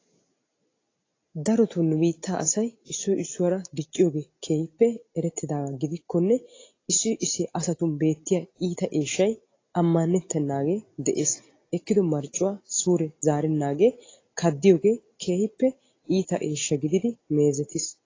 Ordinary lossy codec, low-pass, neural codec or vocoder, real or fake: AAC, 32 kbps; 7.2 kHz; none; real